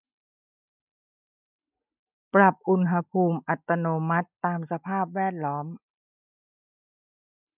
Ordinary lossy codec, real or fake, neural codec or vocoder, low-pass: none; real; none; 3.6 kHz